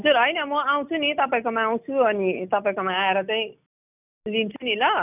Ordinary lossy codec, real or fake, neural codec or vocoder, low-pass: none; real; none; 3.6 kHz